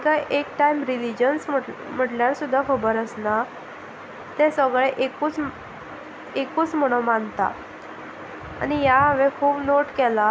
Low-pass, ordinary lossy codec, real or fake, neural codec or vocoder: none; none; real; none